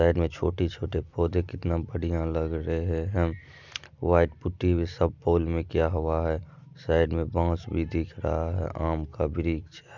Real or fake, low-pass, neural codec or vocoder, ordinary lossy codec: real; 7.2 kHz; none; none